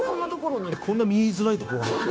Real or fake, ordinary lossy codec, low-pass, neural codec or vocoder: fake; none; none; codec, 16 kHz, 0.9 kbps, LongCat-Audio-Codec